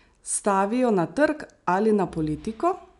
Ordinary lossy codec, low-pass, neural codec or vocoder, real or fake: none; 10.8 kHz; none; real